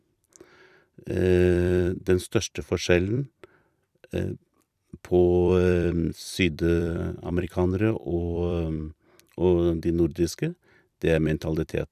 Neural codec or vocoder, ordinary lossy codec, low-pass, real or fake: vocoder, 44.1 kHz, 128 mel bands every 256 samples, BigVGAN v2; none; 14.4 kHz; fake